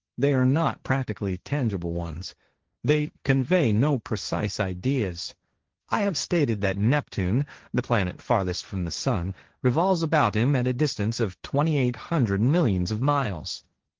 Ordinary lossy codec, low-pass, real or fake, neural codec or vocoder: Opus, 16 kbps; 7.2 kHz; fake; codec, 16 kHz, 1.1 kbps, Voila-Tokenizer